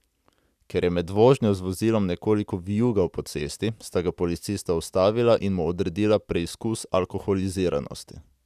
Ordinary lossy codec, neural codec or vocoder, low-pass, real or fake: none; none; 14.4 kHz; real